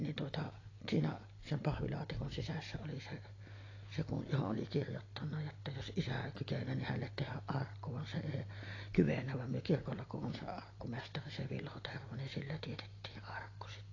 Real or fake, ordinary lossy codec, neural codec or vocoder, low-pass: real; AAC, 32 kbps; none; 7.2 kHz